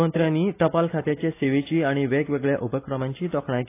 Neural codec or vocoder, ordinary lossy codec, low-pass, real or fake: none; AAC, 24 kbps; 3.6 kHz; real